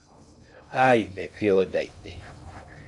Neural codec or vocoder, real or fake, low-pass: codec, 16 kHz in and 24 kHz out, 0.6 kbps, FocalCodec, streaming, 2048 codes; fake; 10.8 kHz